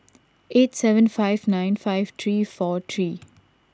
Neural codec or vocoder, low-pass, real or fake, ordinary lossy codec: none; none; real; none